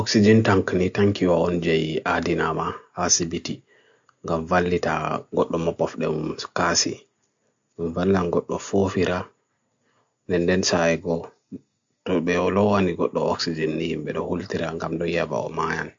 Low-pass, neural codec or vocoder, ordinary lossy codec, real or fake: 7.2 kHz; none; AAC, 64 kbps; real